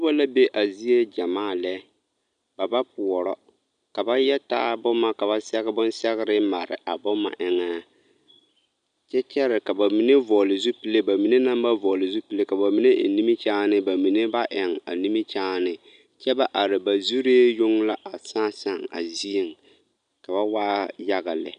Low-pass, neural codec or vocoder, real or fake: 9.9 kHz; none; real